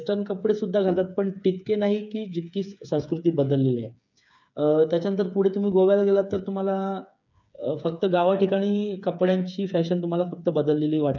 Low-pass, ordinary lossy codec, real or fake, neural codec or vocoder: 7.2 kHz; none; fake; codec, 16 kHz, 8 kbps, FreqCodec, smaller model